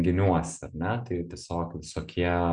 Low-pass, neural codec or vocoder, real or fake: 9.9 kHz; none; real